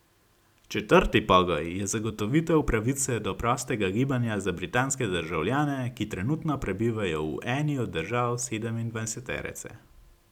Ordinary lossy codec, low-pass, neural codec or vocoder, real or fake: none; 19.8 kHz; none; real